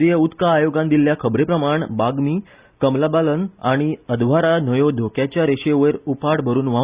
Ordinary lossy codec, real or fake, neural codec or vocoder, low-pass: Opus, 64 kbps; real; none; 3.6 kHz